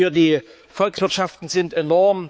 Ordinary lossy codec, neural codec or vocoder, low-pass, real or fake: none; codec, 16 kHz, 4 kbps, X-Codec, HuBERT features, trained on balanced general audio; none; fake